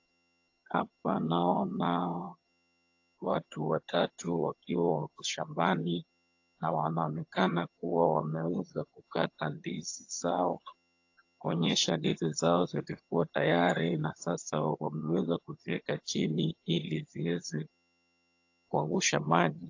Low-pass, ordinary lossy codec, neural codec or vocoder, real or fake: 7.2 kHz; AAC, 48 kbps; vocoder, 22.05 kHz, 80 mel bands, HiFi-GAN; fake